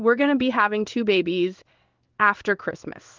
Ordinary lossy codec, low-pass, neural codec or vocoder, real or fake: Opus, 32 kbps; 7.2 kHz; codec, 16 kHz in and 24 kHz out, 1 kbps, XY-Tokenizer; fake